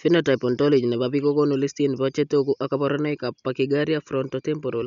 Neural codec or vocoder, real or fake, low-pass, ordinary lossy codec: none; real; 7.2 kHz; none